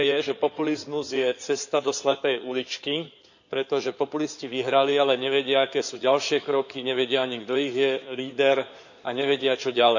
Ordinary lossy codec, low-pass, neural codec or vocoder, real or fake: none; 7.2 kHz; codec, 16 kHz in and 24 kHz out, 2.2 kbps, FireRedTTS-2 codec; fake